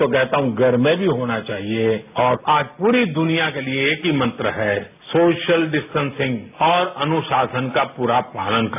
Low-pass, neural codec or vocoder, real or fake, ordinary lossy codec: 3.6 kHz; none; real; AAC, 32 kbps